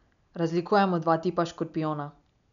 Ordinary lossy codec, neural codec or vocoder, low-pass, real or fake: none; none; 7.2 kHz; real